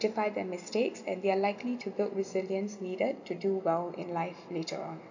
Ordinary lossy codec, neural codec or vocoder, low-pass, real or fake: none; none; 7.2 kHz; real